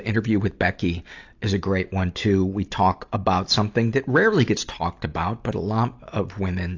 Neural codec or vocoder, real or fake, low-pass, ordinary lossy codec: none; real; 7.2 kHz; AAC, 48 kbps